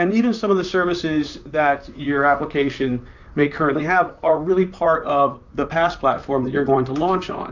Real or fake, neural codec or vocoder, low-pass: fake; vocoder, 44.1 kHz, 128 mel bands, Pupu-Vocoder; 7.2 kHz